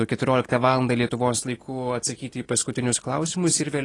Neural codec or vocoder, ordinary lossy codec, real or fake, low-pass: none; AAC, 32 kbps; real; 10.8 kHz